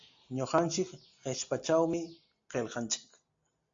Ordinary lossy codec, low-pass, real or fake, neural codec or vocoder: AAC, 48 kbps; 7.2 kHz; real; none